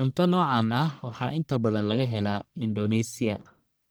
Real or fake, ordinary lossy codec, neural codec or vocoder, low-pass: fake; none; codec, 44.1 kHz, 1.7 kbps, Pupu-Codec; none